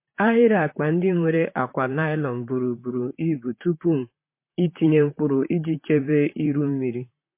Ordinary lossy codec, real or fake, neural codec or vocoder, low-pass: MP3, 24 kbps; fake; vocoder, 44.1 kHz, 128 mel bands, Pupu-Vocoder; 3.6 kHz